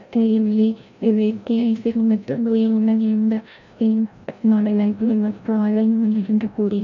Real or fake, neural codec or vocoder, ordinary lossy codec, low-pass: fake; codec, 16 kHz, 0.5 kbps, FreqCodec, larger model; none; 7.2 kHz